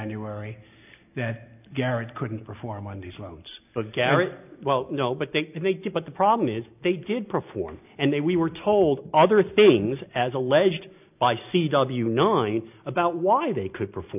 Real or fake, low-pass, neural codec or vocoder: real; 3.6 kHz; none